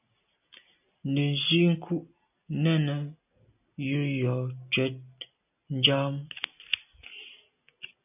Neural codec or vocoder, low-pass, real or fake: none; 3.6 kHz; real